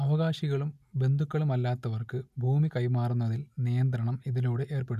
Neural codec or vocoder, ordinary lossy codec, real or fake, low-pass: none; none; real; 14.4 kHz